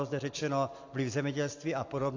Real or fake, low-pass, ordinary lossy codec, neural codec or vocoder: real; 7.2 kHz; AAC, 48 kbps; none